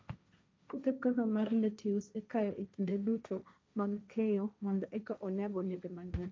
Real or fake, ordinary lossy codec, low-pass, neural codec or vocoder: fake; none; none; codec, 16 kHz, 1.1 kbps, Voila-Tokenizer